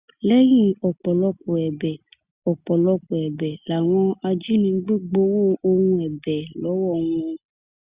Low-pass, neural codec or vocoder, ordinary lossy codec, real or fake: 3.6 kHz; none; Opus, 64 kbps; real